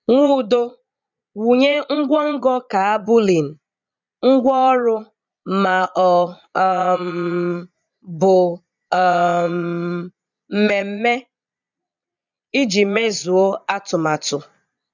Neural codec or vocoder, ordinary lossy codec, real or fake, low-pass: vocoder, 22.05 kHz, 80 mel bands, Vocos; none; fake; 7.2 kHz